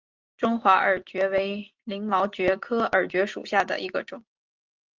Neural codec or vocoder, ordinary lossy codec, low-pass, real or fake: none; Opus, 32 kbps; 7.2 kHz; real